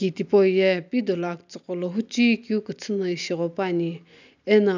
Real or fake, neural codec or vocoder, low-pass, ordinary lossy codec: real; none; 7.2 kHz; none